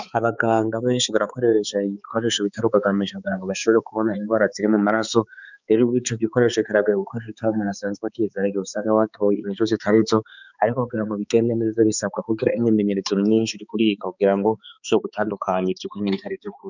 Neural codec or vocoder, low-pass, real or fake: codec, 16 kHz, 4 kbps, X-Codec, HuBERT features, trained on balanced general audio; 7.2 kHz; fake